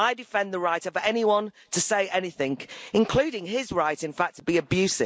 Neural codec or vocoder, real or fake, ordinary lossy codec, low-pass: none; real; none; none